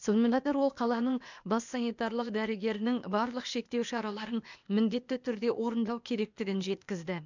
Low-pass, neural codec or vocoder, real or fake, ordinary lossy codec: 7.2 kHz; codec, 16 kHz, 0.8 kbps, ZipCodec; fake; none